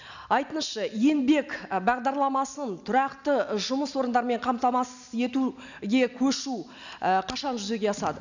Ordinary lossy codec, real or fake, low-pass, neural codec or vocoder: none; real; 7.2 kHz; none